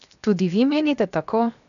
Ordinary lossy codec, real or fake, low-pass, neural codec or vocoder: none; fake; 7.2 kHz; codec, 16 kHz, 0.7 kbps, FocalCodec